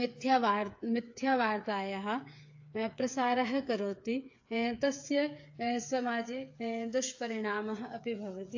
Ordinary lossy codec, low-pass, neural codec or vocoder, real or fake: AAC, 48 kbps; 7.2 kHz; codec, 16 kHz, 8 kbps, FreqCodec, smaller model; fake